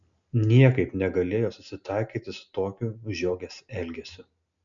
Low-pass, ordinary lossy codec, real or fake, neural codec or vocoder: 7.2 kHz; MP3, 96 kbps; real; none